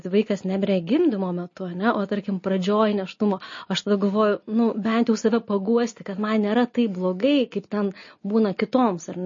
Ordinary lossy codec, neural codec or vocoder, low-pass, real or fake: MP3, 32 kbps; none; 7.2 kHz; real